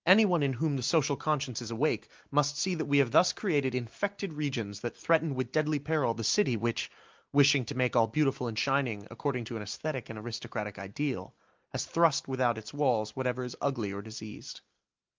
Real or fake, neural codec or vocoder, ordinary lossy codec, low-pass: real; none; Opus, 24 kbps; 7.2 kHz